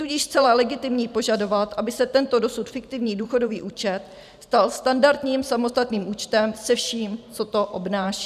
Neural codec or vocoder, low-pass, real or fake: vocoder, 44.1 kHz, 128 mel bands every 512 samples, BigVGAN v2; 14.4 kHz; fake